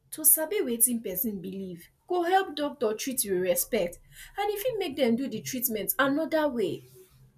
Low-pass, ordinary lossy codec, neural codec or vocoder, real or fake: 14.4 kHz; none; none; real